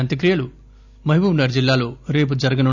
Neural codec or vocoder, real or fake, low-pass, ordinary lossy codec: none; real; 7.2 kHz; none